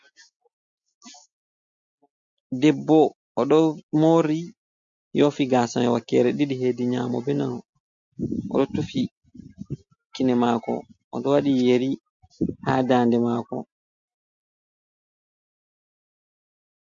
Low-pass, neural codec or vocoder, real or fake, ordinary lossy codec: 7.2 kHz; none; real; MP3, 48 kbps